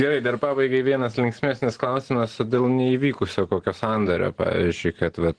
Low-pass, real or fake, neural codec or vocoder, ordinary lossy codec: 9.9 kHz; real; none; Opus, 16 kbps